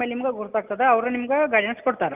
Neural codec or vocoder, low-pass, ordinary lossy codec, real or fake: none; 3.6 kHz; Opus, 32 kbps; real